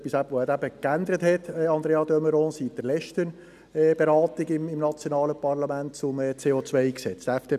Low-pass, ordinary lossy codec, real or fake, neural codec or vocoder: 14.4 kHz; none; real; none